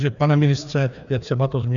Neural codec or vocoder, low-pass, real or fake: codec, 16 kHz, 2 kbps, FreqCodec, larger model; 7.2 kHz; fake